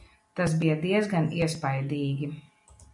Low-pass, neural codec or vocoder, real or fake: 10.8 kHz; none; real